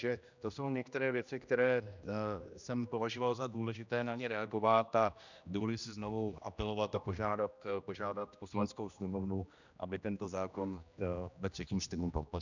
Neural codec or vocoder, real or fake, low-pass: codec, 16 kHz, 1 kbps, X-Codec, HuBERT features, trained on general audio; fake; 7.2 kHz